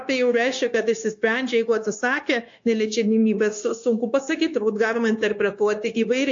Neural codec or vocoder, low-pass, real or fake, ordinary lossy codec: codec, 16 kHz, 0.9 kbps, LongCat-Audio-Codec; 7.2 kHz; fake; AAC, 48 kbps